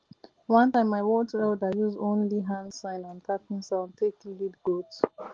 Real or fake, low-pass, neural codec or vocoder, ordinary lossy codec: real; 7.2 kHz; none; Opus, 16 kbps